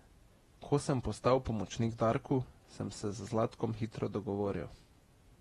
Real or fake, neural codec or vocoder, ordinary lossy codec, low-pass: fake; vocoder, 48 kHz, 128 mel bands, Vocos; AAC, 32 kbps; 19.8 kHz